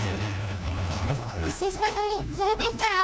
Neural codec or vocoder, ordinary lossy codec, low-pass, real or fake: codec, 16 kHz, 1 kbps, FunCodec, trained on LibriTTS, 50 frames a second; none; none; fake